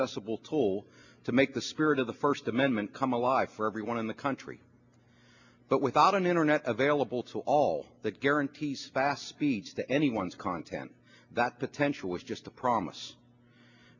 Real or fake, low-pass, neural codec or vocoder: real; 7.2 kHz; none